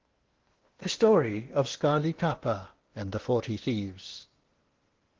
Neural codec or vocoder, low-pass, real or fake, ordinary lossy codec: codec, 16 kHz in and 24 kHz out, 0.6 kbps, FocalCodec, streaming, 2048 codes; 7.2 kHz; fake; Opus, 16 kbps